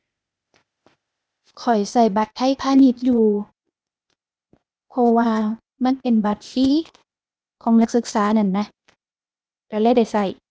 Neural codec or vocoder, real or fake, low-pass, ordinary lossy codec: codec, 16 kHz, 0.8 kbps, ZipCodec; fake; none; none